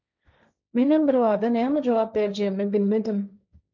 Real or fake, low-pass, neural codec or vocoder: fake; 7.2 kHz; codec, 16 kHz, 1.1 kbps, Voila-Tokenizer